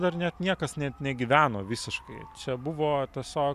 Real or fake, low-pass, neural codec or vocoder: real; 14.4 kHz; none